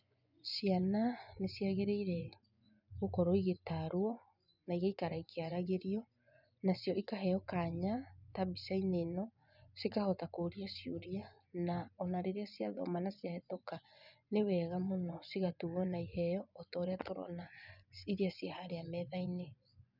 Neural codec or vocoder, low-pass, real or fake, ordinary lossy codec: vocoder, 24 kHz, 100 mel bands, Vocos; 5.4 kHz; fake; none